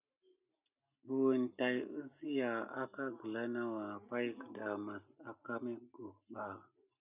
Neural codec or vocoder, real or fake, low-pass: none; real; 3.6 kHz